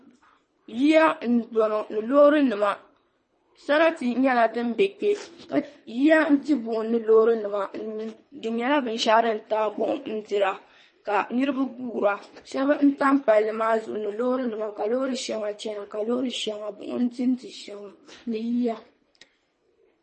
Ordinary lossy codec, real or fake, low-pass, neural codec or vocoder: MP3, 32 kbps; fake; 10.8 kHz; codec, 24 kHz, 3 kbps, HILCodec